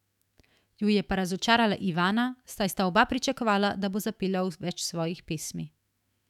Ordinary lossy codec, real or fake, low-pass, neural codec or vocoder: none; fake; 19.8 kHz; autoencoder, 48 kHz, 128 numbers a frame, DAC-VAE, trained on Japanese speech